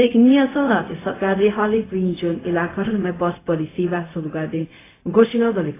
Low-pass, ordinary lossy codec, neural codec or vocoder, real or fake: 3.6 kHz; AAC, 16 kbps; codec, 16 kHz, 0.4 kbps, LongCat-Audio-Codec; fake